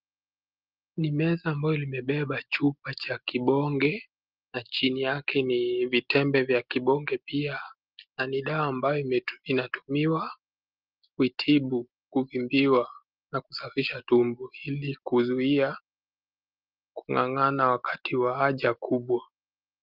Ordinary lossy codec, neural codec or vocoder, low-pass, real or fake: Opus, 32 kbps; none; 5.4 kHz; real